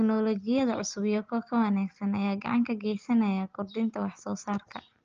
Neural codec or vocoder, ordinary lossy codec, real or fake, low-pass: none; Opus, 24 kbps; real; 7.2 kHz